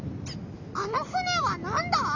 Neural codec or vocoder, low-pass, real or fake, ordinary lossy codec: none; 7.2 kHz; real; none